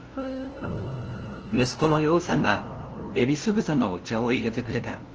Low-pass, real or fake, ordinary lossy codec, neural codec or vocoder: 7.2 kHz; fake; Opus, 16 kbps; codec, 16 kHz, 0.5 kbps, FunCodec, trained on LibriTTS, 25 frames a second